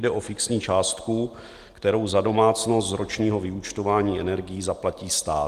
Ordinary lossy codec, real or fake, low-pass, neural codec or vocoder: Opus, 24 kbps; real; 14.4 kHz; none